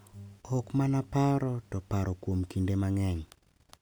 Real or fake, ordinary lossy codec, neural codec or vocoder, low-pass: real; none; none; none